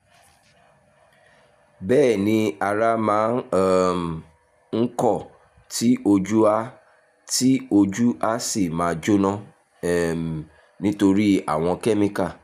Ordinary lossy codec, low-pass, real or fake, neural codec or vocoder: none; 14.4 kHz; real; none